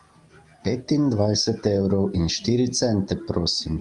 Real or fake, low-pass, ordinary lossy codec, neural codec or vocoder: real; 10.8 kHz; Opus, 32 kbps; none